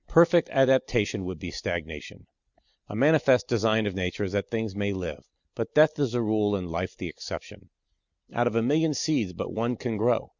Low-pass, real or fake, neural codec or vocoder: 7.2 kHz; real; none